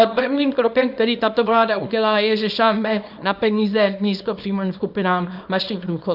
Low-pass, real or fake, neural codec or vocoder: 5.4 kHz; fake; codec, 24 kHz, 0.9 kbps, WavTokenizer, small release